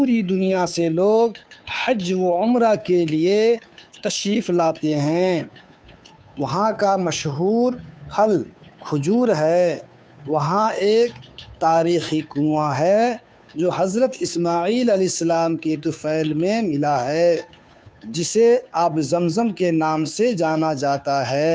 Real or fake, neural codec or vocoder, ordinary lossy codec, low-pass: fake; codec, 16 kHz, 2 kbps, FunCodec, trained on Chinese and English, 25 frames a second; none; none